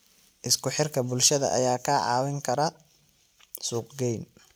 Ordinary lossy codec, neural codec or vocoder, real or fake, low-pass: none; none; real; none